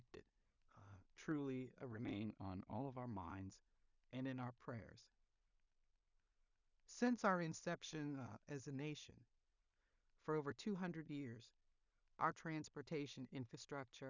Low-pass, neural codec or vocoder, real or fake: 7.2 kHz; codec, 16 kHz in and 24 kHz out, 0.4 kbps, LongCat-Audio-Codec, two codebook decoder; fake